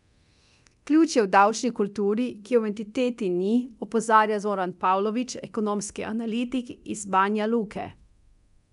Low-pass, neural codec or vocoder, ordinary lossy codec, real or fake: 10.8 kHz; codec, 24 kHz, 0.9 kbps, DualCodec; none; fake